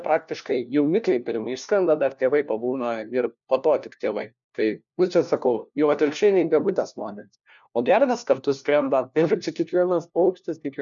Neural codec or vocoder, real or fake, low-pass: codec, 16 kHz, 1 kbps, FunCodec, trained on LibriTTS, 50 frames a second; fake; 7.2 kHz